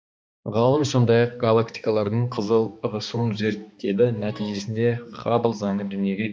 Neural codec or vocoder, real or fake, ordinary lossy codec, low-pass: codec, 16 kHz, 2 kbps, X-Codec, HuBERT features, trained on balanced general audio; fake; none; none